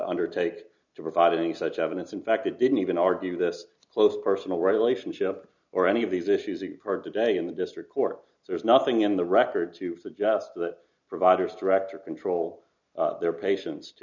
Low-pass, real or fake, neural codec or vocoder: 7.2 kHz; real; none